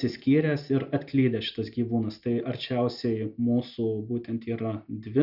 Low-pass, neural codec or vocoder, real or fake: 5.4 kHz; none; real